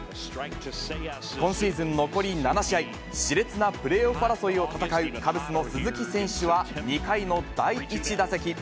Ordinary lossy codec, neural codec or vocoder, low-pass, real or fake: none; none; none; real